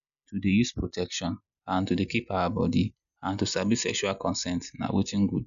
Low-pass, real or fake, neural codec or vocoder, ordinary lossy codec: 7.2 kHz; real; none; none